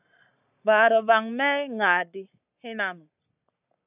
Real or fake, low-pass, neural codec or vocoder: fake; 3.6 kHz; codec, 44.1 kHz, 7.8 kbps, Pupu-Codec